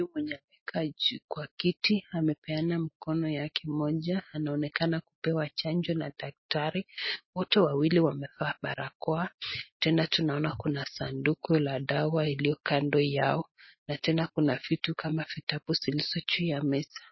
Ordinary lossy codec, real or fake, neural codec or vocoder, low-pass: MP3, 24 kbps; real; none; 7.2 kHz